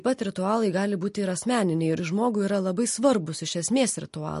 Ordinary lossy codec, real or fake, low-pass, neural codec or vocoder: MP3, 48 kbps; real; 14.4 kHz; none